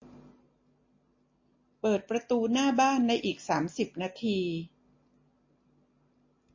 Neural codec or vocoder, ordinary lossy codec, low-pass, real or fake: none; MP3, 32 kbps; 7.2 kHz; real